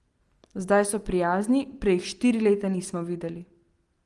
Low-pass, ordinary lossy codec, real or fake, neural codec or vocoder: 10.8 kHz; Opus, 24 kbps; real; none